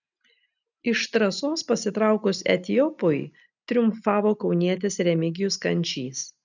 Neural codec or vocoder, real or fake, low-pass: none; real; 7.2 kHz